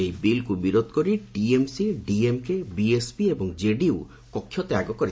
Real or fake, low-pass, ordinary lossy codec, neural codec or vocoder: real; none; none; none